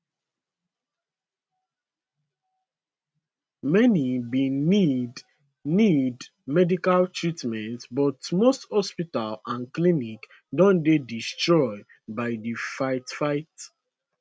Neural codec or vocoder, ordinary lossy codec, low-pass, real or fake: none; none; none; real